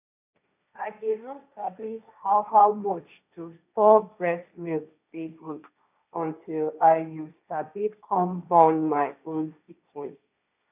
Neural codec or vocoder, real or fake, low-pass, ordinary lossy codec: codec, 16 kHz, 1.1 kbps, Voila-Tokenizer; fake; 3.6 kHz; none